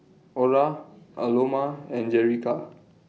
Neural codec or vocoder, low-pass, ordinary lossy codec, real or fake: none; none; none; real